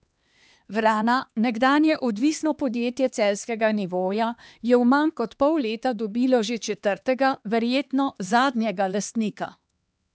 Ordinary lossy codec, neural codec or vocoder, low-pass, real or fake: none; codec, 16 kHz, 2 kbps, X-Codec, HuBERT features, trained on LibriSpeech; none; fake